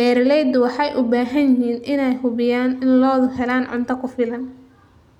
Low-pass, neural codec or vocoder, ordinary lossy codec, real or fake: 19.8 kHz; none; none; real